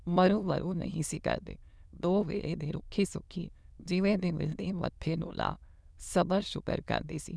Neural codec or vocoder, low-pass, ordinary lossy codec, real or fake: autoencoder, 22.05 kHz, a latent of 192 numbers a frame, VITS, trained on many speakers; none; none; fake